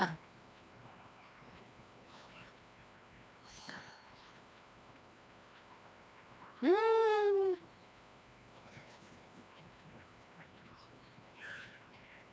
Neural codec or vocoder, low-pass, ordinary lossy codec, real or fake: codec, 16 kHz, 1 kbps, FreqCodec, larger model; none; none; fake